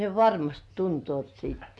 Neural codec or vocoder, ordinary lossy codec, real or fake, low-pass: none; none; real; none